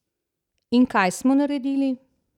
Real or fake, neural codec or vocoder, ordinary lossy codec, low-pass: real; none; none; 19.8 kHz